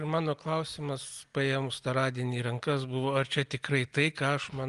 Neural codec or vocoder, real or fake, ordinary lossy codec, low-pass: none; real; Opus, 32 kbps; 10.8 kHz